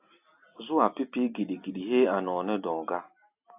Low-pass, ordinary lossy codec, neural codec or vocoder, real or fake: 3.6 kHz; AAC, 32 kbps; none; real